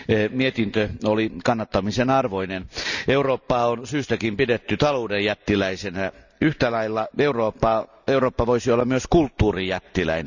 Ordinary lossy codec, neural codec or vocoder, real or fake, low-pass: none; none; real; 7.2 kHz